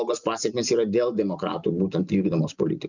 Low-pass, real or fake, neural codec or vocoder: 7.2 kHz; real; none